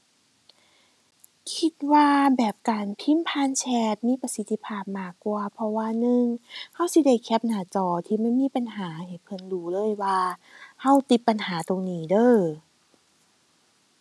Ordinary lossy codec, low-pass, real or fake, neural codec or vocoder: none; none; real; none